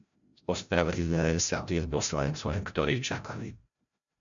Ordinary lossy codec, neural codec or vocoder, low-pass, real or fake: MP3, 64 kbps; codec, 16 kHz, 0.5 kbps, FreqCodec, larger model; 7.2 kHz; fake